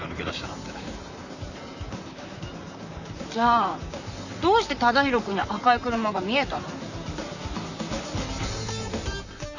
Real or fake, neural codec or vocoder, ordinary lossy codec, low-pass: fake; vocoder, 44.1 kHz, 128 mel bands, Pupu-Vocoder; none; 7.2 kHz